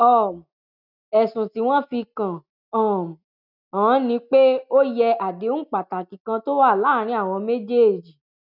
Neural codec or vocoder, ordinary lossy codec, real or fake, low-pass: none; none; real; 5.4 kHz